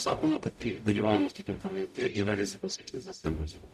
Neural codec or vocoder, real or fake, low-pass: codec, 44.1 kHz, 0.9 kbps, DAC; fake; 14.4 kHz